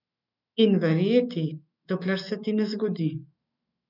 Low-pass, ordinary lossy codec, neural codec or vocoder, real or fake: 5.4 kHz; none; autoencoder, 48 kHz, 128 numbers a frame, DAC-VAE, trained on Japanese speech; fake